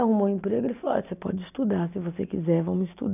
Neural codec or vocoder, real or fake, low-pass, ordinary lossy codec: none; real; 3.6 kHz; none